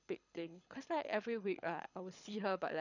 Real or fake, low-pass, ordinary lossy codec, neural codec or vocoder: fake; 7.2 kHz; none; codec, 24 kHz, 6 kbps, HILCodec